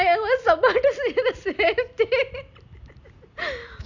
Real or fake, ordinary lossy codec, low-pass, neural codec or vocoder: real; none; 7.2 kHz; none